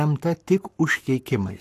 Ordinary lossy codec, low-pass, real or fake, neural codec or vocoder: AAC, 64 kbps; 14.4 kHz; fake; codec, 44.1 kHz, 7.8 kbps, Pupu-Codec